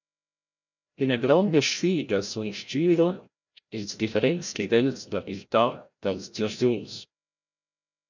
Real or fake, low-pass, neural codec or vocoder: fake; 7.2 kHz; codec, 16 kHz, 0.5 kbps, FreqCodec, larger model